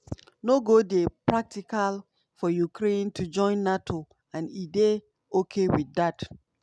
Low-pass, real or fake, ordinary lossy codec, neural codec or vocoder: none; real; none; none